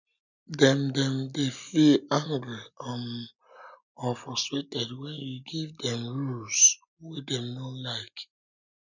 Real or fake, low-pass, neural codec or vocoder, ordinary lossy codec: real; 7.2 kHz; none; none